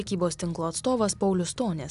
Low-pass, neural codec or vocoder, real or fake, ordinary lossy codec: 10.8 kHz; none; real; AAC, 96 kbps